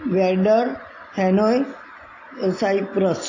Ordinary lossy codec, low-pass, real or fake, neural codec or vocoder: AAC, 32 kbps; 7.2 kHz; real; none